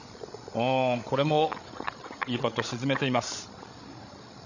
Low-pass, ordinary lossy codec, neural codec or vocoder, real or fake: 7.2 kHz; none; codec, 16 kHz, 16 kbps, FreqCodec, larger model; fake